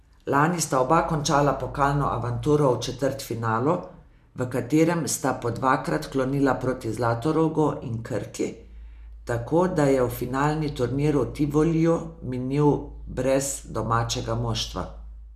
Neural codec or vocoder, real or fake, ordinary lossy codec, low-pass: none; real; none; 14.4 kHz